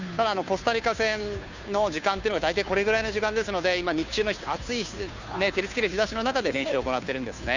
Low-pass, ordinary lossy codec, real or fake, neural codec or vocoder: 7.2 kHz; none; fake; codec, 16 kHz, 2 kbps, FunCodec, trained on Chinese and English, 25 frames a second